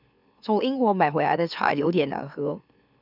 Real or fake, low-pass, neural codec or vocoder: fake; 5.4 kHz; autoencoder, 44.1 kHz, a latent of 192 numbers a frame, MeloTTS